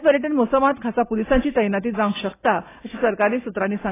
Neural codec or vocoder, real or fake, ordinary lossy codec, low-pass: none; real; AAC, 16 kbps; 3.6 kHz